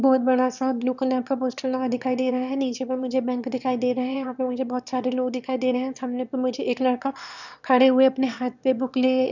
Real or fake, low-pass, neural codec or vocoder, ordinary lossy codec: fake; 7.2 kHz; autoencoder, 22.05 kHz, a latent of 192 numbers a frame, VITS, trained on one speaker; none